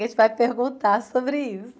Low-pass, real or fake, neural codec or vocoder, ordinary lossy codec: none; real; none; none